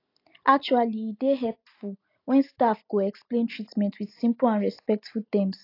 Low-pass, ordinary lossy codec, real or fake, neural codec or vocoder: 5.4 kHz; AAC, 32 kbps; real; none